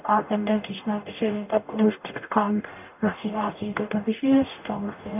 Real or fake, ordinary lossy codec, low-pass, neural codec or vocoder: fake; none; 3.6 kHz; codec, 44.1 kHz, 0.9 kbps, DAC